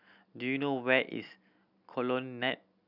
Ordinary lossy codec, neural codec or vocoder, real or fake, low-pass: none; none; real; 5.4 kHz